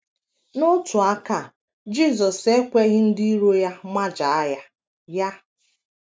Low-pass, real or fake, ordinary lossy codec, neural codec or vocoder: none; real; none; none